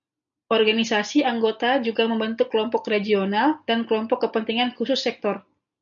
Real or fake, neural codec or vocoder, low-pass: real; none; 7.2 kHz